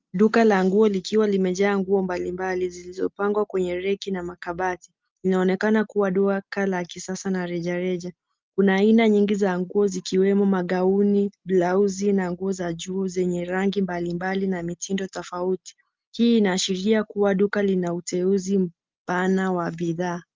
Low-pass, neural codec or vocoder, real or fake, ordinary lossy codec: 7.2 kHz; none; real; Opus, 24 kbps